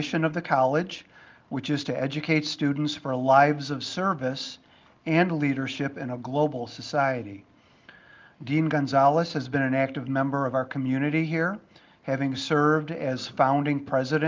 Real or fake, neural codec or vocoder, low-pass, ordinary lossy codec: real; none; 7.2 kHz; Opus, 32 kbps